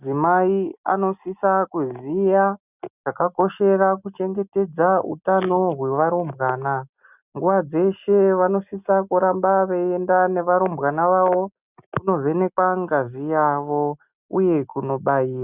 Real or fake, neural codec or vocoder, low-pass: real; none; 3.6 kHz